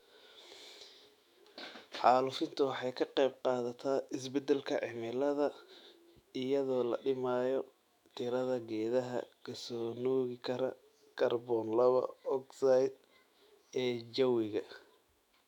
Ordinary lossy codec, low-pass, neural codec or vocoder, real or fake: none; 19.8 kHz; autoencoder, 48 kHz, 128 numbers a frame, DAC-VAE, trained on Japanese speech; fake